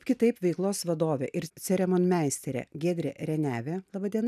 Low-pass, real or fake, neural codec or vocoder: 14.4 kHz; real; none